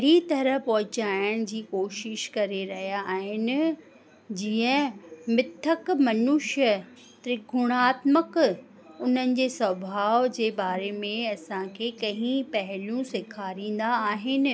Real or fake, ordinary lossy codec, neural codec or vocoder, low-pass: real; none; none; none